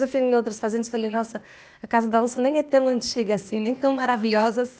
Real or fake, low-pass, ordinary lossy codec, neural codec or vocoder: fake; none; none; codec, 16 kHz, 0.8 kbps, ZipCodec